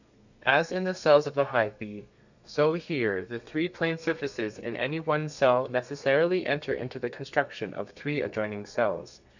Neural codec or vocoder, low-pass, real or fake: codec, 32 kHz, 1.9 kbps, SNAC; 7.2 kHz; fake